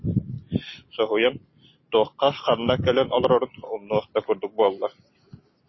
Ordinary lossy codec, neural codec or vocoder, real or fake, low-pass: MP3, 24 kbps; none; real; 7.2 kHz